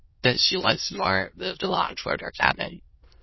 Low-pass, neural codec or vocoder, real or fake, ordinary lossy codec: 7.2 kHz; autoencoder, 22.05 kHz, a latent of 192 numbers a frame, VITS, trained on many speakers; fake; MP3, 24 kbps